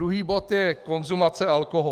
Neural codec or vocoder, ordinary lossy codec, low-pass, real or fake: codec, 44.1 kHz, 7.8 kbps, DAC; Opus, 32 kbps; 14.4 kHz; fake